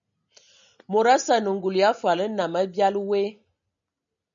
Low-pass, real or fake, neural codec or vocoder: 7.2 kHz; real; none